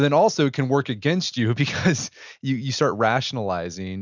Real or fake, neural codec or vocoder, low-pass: real; none; 7.2 kHz